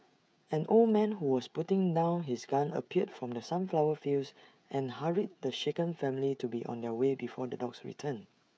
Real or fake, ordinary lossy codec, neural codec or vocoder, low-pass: fake; none; codec, 16 kHz, 16 kbps, FreqCodec, smaller model; none